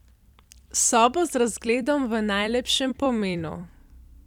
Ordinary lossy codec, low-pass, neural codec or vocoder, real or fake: none; 19.8 kHz; vocoder, 44.1 kHz, 128 mel bands every 256 samples, BigVGAN v2; fake